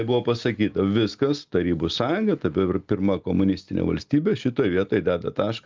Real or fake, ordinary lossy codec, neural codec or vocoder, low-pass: real; Opus, 24 kbps; none; 7.2 kHz